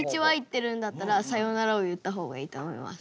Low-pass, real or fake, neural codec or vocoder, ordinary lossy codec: none; real; none; none